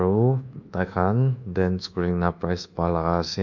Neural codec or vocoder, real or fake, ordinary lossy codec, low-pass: codec, 24 kHz, 1.2 kbps, DualCodec; fake; none; 7.2 kHz